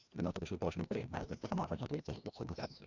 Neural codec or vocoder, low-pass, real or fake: codec, 16 kHz, 1 kbps, FreqCodec, larger model; 7.2 kHz; fake